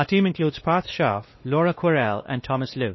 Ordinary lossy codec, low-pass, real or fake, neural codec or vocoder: MP3, 24 kbps; 7.2 kHz; fake; codec, 16 kHz, 2 kbps, X-Codec, WavLM features, trained on Multilingual LibriSpeech